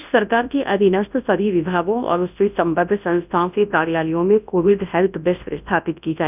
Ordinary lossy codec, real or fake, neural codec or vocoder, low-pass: none; fake; codec, 24 kHz, 0.9 kbps, WavTokenizer, large speech release; 3.6 kHz